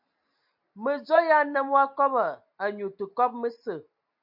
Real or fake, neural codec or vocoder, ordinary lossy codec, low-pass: real; none; MP3, 48 kbps; 5.4 kHz